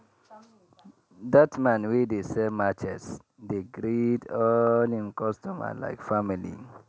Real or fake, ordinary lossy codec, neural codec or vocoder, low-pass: real; none; none; none